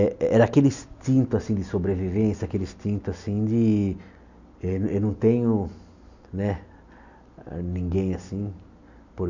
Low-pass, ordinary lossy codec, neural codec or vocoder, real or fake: 7.2 kHz; none; none; real